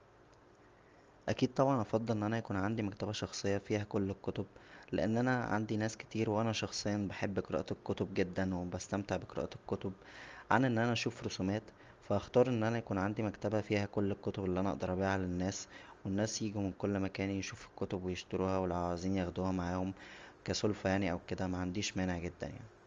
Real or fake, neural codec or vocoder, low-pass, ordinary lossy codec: real; none; 7.2 kHz; Opus, 32 kbps